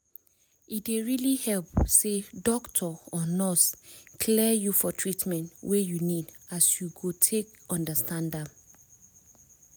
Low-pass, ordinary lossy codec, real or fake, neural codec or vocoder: none; none; real; none